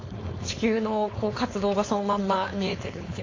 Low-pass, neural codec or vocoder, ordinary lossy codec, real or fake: 7.2 kHz; codec, 16 kHz, 4.8 kbps, FACodec; AAC, 32 kbps; fake